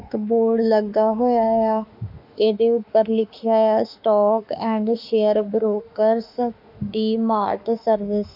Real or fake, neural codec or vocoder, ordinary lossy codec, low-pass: fake; autoencoder, 48 kHz, 32 numbers a frame, DAC-VAE, trained on Japanese speech; none; 5.4 kHz